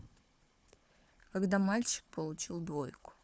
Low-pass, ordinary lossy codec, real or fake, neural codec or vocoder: none; none; fake; codec, 16 kHz, 4 kbps, FunCodec, trained on Chinese and English, 50 frames a second